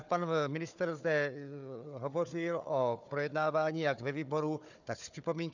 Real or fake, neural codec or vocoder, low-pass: fake; codec, 16 kHz, 4 kbps, FunCodec, trained on Chinese and English, 50 frames a second; 7.2 kHz